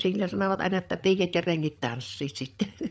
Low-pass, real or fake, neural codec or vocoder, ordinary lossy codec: none; fake; codec, 16 kHz, 8 kbps, FreqCodec, larger model; none